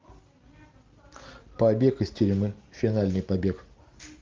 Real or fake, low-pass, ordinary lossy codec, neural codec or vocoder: real; 7.2 kHz; Opus, 32 kbps; none